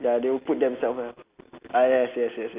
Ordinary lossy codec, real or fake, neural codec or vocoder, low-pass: Opus, 64 kbps; real; none; 3.6 kHz